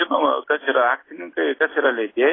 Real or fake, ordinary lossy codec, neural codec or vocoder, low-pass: real; AAC, 16 kbps; none; 7.2 kHz